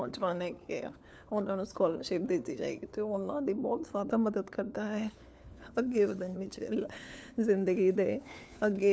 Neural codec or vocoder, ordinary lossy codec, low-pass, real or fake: codec, 16 kHz, 4 kbps, FunCodec, trained on LibriTTS, 50 frames a second; none; none; fake